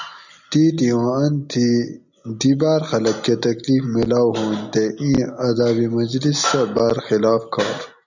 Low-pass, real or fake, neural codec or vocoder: 7.2 kHz; real; none